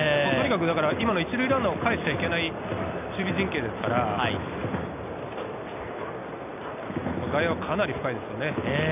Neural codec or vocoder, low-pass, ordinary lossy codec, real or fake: none; 3.6 kHz; none; real